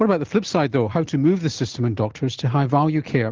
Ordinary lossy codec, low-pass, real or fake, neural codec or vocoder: Opus, 16 kbps; 7.2 kHz; real; none